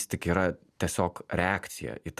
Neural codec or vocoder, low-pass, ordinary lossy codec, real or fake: none; 14.4 kHz; Opus, 64 kbps; real